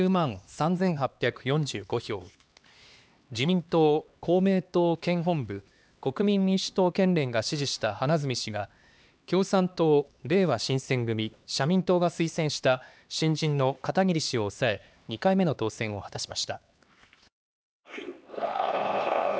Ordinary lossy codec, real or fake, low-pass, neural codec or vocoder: none; fake; none; codec, 16 kHz, 2 kbps, X-Codec, HuBERT features, trained on LibriSpeech